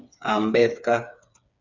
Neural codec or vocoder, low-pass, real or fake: codec, 16 kHz, 4 kbps, FreqCodec, smaller model; 7.2 kHz; fake